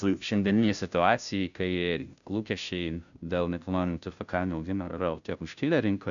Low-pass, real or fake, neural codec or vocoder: 7.2 kHz; fake; codec, 16 kHz, 0.5 kbps, FunCodec, trained on Chinese and English, 25 frames a second